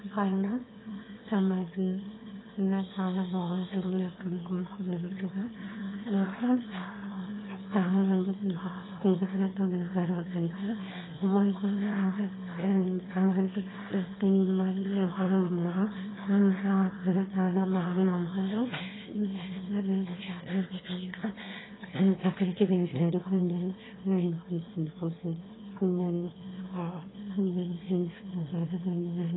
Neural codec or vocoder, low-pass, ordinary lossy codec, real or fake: autoencoder, 22.05 kHz, a latent of 192 numbers a frame, VITS, trained on one speaker; 7.2 kHz; AAC, 16 kbps; fake